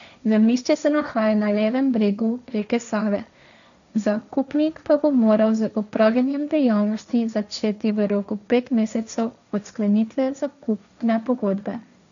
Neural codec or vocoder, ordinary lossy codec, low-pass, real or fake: codec, 16 kHz, 1.1 kbps, Voila-Tokenizer; none; 7.2 kHz; fake